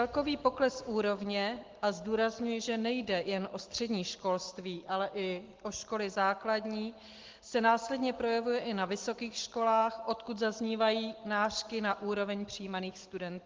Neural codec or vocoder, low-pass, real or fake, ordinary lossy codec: none; 7.2 kHz; real; Opus, 16 kbps